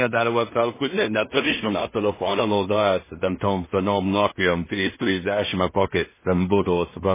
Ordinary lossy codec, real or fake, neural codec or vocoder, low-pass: MP3, 16 kbps; fake; codec, 16 kHz in and 24 kHz out, 0.4 kbps, LongCat-Audio-Codec, two codebook decoder; 3.6 kHz